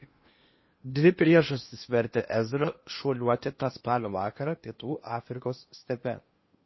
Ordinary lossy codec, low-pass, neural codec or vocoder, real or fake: MP3, 24 kbps; 7.2 kHz; codec, 16 kHz in and 24 kHz out, 0.8 kbps, FocalCodec, streaming, 65536 codes; fake